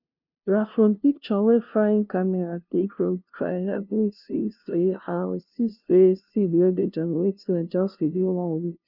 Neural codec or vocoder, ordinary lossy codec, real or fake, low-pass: codec, 16 kHz, 0.5 kbps, FunCodec, trained on LibriTTS, 25 frames a second; none; fake; 5.4 kHz